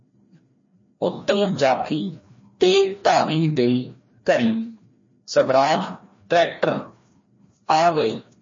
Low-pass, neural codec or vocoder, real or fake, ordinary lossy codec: 7.2 kHz; codec, 16 kHz, 1 kbps, FreqCodec, larger model; fake; MP3, 32 kbps